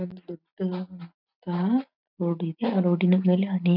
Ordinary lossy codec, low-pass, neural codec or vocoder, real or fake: none; 5.4 kHz; none; real